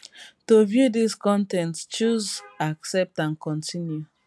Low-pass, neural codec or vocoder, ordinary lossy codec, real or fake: none; none; none; real